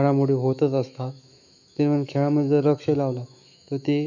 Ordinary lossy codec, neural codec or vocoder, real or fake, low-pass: none; autoencoder, 48 kHz, 128 numbers a frame, DAC-VAE, trained on Japanese speech; fake; 7.2 kHz